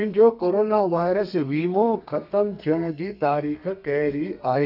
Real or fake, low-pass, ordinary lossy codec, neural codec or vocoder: fake; 5.4 kHz; none; codec, 32 kHz, 1.9 kbps, SNAC